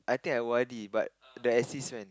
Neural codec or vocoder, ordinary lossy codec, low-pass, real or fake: none; none; none; real